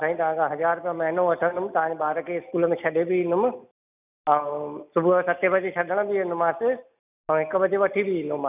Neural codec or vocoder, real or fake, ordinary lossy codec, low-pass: none; real; none; 3.6 kHz